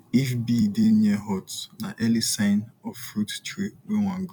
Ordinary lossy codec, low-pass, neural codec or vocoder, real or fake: none; 19.8 kHz; vocoder, 44.1 kHz, 128 mel bands every 256 samples, BigVGAN v2; fake